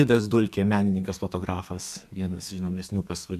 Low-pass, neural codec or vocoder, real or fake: 14.4 kHz; codec, 32 kHz, 1.9 kbps, SNAC; fake